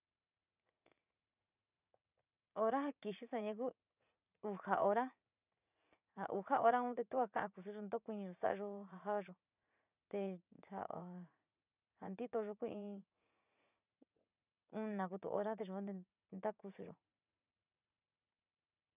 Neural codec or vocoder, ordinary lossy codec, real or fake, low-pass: vocoder, 44.1 kHz, 80 mel bands, Vocos; none; fake; 3.6 kHz